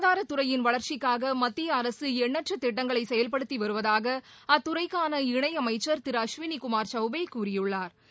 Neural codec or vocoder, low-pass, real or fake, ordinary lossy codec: none; none; real; none